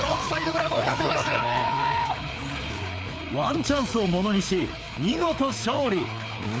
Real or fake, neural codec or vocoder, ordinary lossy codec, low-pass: fake; codec, 16 kHz, 4 kbps, FreqCodec, larger model; none; none